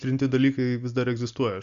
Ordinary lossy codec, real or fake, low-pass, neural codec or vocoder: MP3, 64 kbps; real; 7.2 kHz; none